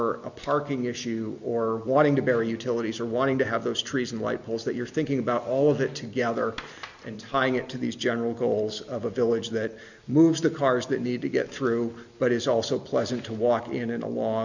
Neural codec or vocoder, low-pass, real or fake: none; 7.2 kHz; real